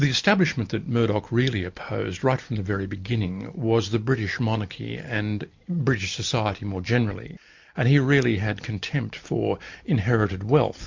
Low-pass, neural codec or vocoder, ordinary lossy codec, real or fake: 7.2 kHz; none; MP3, 48 kbps; real